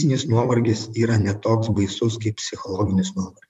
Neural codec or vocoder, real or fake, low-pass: vocoder, 44.1 kHz, 128 mel bands, Pupu-Vocoder; fake; 14.4 kHz